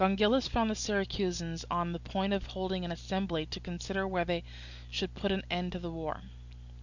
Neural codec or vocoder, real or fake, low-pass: none; real; 7.2 kHz